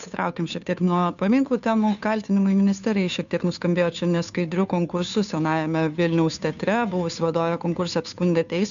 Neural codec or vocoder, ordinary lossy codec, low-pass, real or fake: codec, 16 kHz, 2 kbps, FunCodec, trained on Chinese and English, 25 frames a second; MP3, 96 kbps; 7.2 kHz; fake